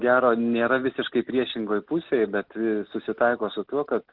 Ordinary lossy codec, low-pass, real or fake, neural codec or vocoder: Opus, 16 kbps; 5.4 kHz; real; none